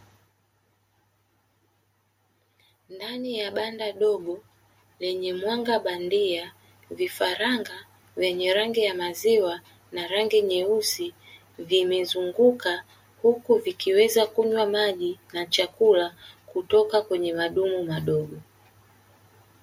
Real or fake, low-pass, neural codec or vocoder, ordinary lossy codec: real; 19.8 kHz; none; MP3, 64 kbps